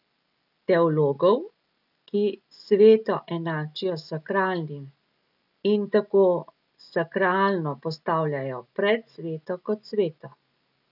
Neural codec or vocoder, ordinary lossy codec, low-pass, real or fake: none; none; 5.4 kHz; real